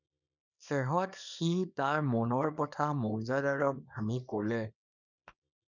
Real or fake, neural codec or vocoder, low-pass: fake; codec, 24 kHz, 0.9 kbps, WavTokenizer, small release; 7.2 kHz